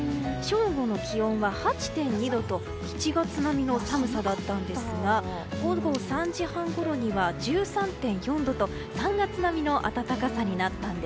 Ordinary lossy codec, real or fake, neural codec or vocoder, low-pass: none; real; none; none